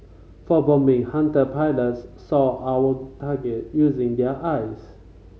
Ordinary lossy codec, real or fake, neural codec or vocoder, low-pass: none; real; none; none